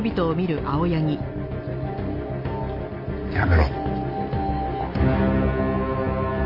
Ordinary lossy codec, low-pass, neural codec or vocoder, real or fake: AAC, 32 kbps; 5.4 kHz; none; real